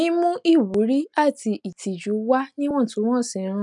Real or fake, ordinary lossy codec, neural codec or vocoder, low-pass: real; none; none; 10.8 kHz